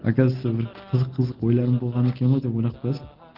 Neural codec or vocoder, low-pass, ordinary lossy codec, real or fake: none; 5.4 kHz; Opus, 16 kbps; real